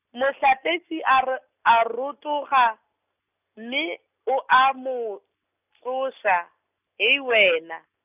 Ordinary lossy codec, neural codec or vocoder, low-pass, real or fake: none; none; 3.6 kHz; real